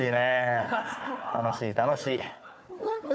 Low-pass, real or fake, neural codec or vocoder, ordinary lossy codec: none; fake; codec, 16 kHz, 4 kbps, FunCodec, trained on Chinese and English, 50 frames a second; none